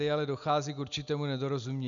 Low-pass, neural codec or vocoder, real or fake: 7.2 kHz; none; real